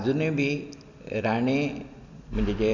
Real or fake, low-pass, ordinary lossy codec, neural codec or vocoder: real; 7.2 kHz; none; none